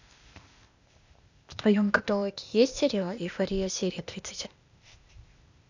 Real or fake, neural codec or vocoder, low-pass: fake; codec, 16 kHz, 0.8 kbps, ZipCodec; 7.2 kHz